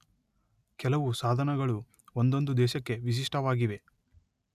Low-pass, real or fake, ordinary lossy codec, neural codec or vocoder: 14.4 kHz; real; none; none